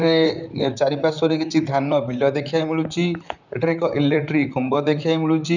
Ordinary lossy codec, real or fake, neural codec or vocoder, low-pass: none; fake; vocoder, 44.1 kHz, 128 mel bands, Pupu-Vocoder; 7.2 kHz